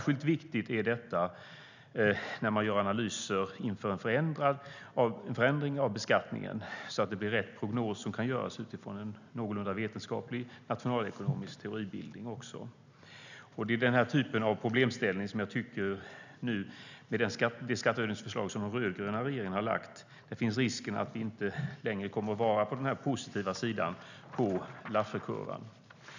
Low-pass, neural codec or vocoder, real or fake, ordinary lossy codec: 7.2 kHz; none; real; none